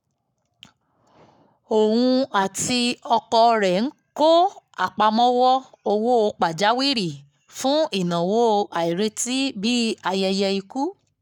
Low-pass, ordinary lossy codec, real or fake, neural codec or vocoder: 19.8 kHz; none; fake; codec, 44.1 kHz, 7.8 kbps, Pupu-Codec